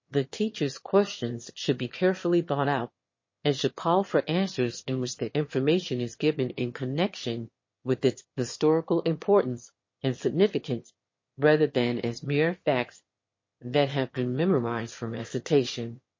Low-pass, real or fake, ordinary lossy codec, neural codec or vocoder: 7.2 kHz; fake; MP3, 32 kbps; autoencoder, 22.05 kHz, a latent of 192 numbers a frame, VITS, trained on one speaker